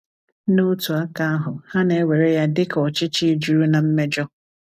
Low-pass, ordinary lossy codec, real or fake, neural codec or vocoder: 14.4 kHz; none; real; none